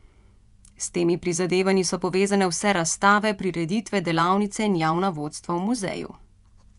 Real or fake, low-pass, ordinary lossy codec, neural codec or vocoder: fake; 10.8 kHz; none; vocoder, 24 kHz, 100 mel bands, Vocos